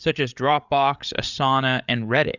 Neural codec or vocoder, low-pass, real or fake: codec, 16 kHz, 8 kbps, FreqCodec, larger model; 7.2 kHz; fake